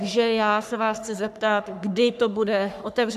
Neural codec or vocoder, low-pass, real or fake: codec, 44.1 kHz, 3.4 kbps, Pupu-Codec; 14.4 kHz; fake